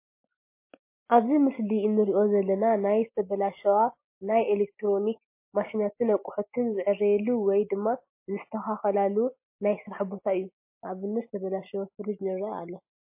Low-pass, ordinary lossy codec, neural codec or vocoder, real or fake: 3.6 kHz; MP3, 24 kbps; none; real